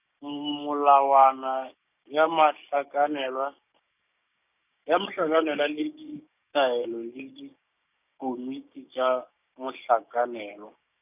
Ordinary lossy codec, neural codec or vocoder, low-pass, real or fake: none; codec, 16 kHz, 6 kbps, DAC; 3.6 kHz; fake